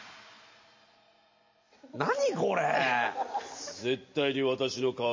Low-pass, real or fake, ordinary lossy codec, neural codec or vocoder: 7.2 kHz; real; MP3, 32 kbps; none